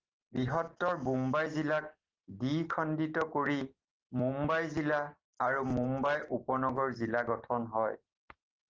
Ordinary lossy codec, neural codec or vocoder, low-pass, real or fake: Opus, 16 kbps; none; 7.2 kHz; real